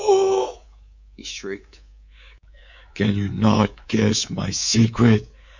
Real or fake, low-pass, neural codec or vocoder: fake; 7.2 kHz; codec, 24 kHz, 3.1 kbps, DualCodec